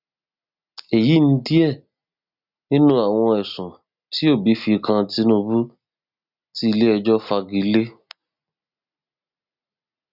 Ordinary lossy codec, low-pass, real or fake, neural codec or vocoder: none; 5.4 kHz; real; none